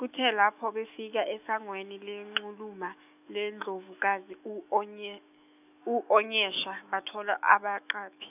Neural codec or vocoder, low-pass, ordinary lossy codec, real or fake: autoencoder, 48 kHz, 128 numbers a frame, DAC-VAE, trained on Japanese speech; 3.6 kHz; none; fake